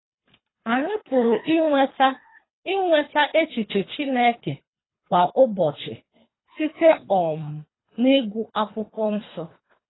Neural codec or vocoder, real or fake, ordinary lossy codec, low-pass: codec, 24 kHz, 3 kbps, HILCodec; fake; AAC, 16 kbps; 7.2 kHz